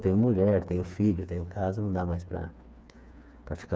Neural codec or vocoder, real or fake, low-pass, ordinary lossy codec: codec, 16 kHz, 4 kbps, FreqCodec, smaller model; fake; none; none